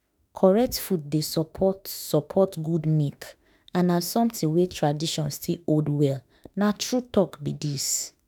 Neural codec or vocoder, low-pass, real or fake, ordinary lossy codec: autoencoder, 48 kHz, 32 numbers a frame, DAC-VAE, trained on Japanese speech; none; fake; none